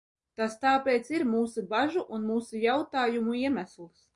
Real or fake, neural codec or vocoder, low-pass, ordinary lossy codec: real; none; 10.8 kHz; MP3, 96 kbps